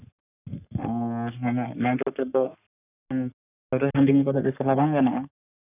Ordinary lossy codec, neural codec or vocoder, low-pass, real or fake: none; codec, 44.1 kHz, 3.4 kbps, Pupu-Codec; 3.6 kHz; fake